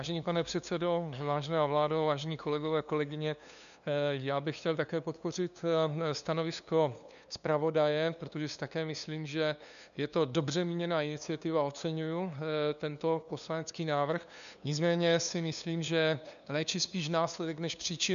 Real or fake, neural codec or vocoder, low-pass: fake; codec, 16 kHz, 2 kbps, FunCodec, trained on LibriTTS, 25 frames a second; 7.2 kHz